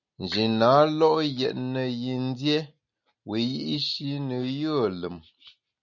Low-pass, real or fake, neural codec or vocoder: 7.2 kHz; real; none